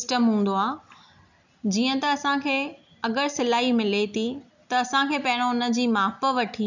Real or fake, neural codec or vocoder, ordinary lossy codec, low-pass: real; none; none; 7.2 kHz